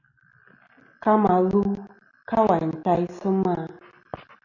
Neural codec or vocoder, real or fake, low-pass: none; real; 7.2 kHz